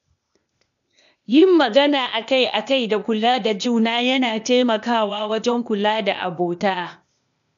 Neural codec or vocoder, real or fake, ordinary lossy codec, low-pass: codec, 16 kHz, 0.8 kbps, ZipCodec; fake; none; 7.2 kHz